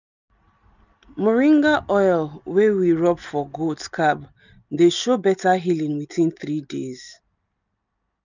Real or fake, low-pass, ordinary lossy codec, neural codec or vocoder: real; 7.2 kHz; none; none